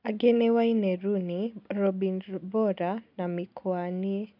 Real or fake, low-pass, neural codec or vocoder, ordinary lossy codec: real; 5.4 kHz; none; none